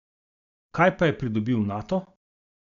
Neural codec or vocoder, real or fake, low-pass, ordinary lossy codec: none; real; 7.2 kHz; none